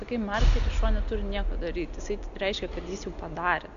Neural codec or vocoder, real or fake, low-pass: none; real; 7.2 kHz